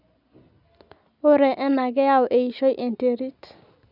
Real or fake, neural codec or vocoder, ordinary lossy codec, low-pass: real; none; none; 5.4 kHz